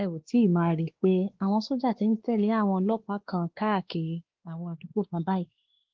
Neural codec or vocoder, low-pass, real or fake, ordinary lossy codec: codec, 16 kHz, 2 kbps, X-Codec, WavLM features, trained on Multilingual LibriSpeech; 7.2 kHz; fake; Opus, 16 kbps